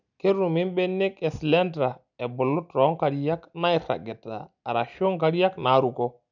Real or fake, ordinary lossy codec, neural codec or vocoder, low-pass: real; none; none; 7.2 kHz